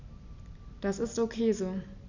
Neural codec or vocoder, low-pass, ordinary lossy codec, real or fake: none; 7.2 kHz; none; real